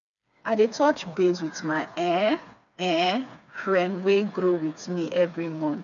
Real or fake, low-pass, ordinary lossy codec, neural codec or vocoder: fake; 7.2 kHz; none; codec, 16 kHz, 4 kbps, FreqCodec, smaller model